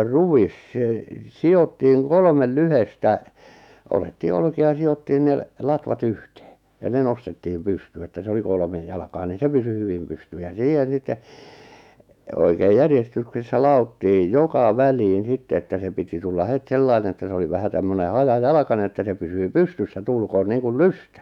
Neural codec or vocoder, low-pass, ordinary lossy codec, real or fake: autoencoder, 48 kHz, 128 numbers a frame, DAC-VAE, trained on Japanese speech; 19.8 kHz; none; fake